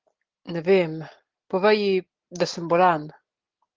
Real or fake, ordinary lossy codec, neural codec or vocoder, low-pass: real; Opus, 16 kbps; none; 7.2 kHz